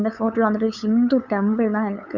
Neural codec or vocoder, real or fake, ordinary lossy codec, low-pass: codec, 16 kHz, 8 kbps, FunCodec, trained on LibriTTS, 25 frames a second; fake; none; 7.2 kHz